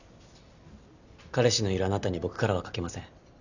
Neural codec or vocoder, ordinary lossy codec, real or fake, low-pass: none; none; real; 7.2 kHz